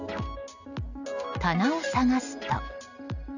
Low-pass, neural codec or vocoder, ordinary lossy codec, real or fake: 7.2 kHz; none; none; real